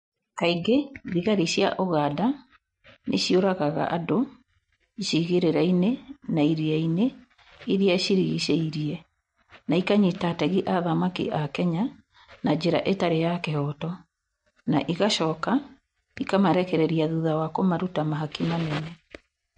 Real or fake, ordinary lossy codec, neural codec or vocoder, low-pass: real; MP3, 48 kbps; none; 10.8 kHz